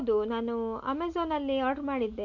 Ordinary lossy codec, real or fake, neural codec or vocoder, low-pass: MP3, 64 kbps; real; none; 7.2 kHz